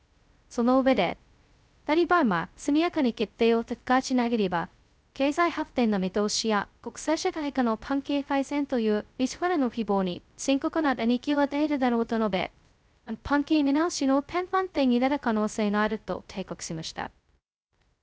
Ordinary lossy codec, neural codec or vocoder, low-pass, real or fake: none; codec, 16 kHz, 0.2 kbps, FocalCodec; none; fake